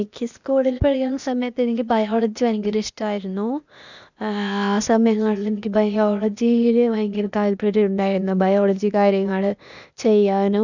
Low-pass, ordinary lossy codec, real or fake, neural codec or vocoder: 7.2 kHz; none; fake; codec, 16 kHz, 0.8 kbps, ZipCodec